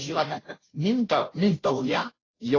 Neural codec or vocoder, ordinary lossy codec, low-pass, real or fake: codec, 16 kHz, 0.5 kbps, FunCodec, trained on Chinese and English, 25 frames a second; AAC, 32 kbps; 7.2 kHz; fake